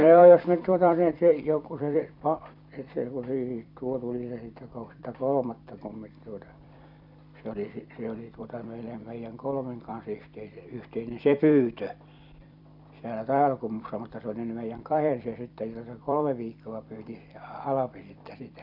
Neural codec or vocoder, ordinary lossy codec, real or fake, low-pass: vocoder, 44.1 kHz, 128 mel bands every 256 samples, BigVGAN v2; none; fake; 5.4 kHz